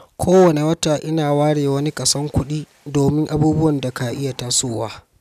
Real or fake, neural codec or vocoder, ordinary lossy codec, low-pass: real; none; none; 14.4 kHz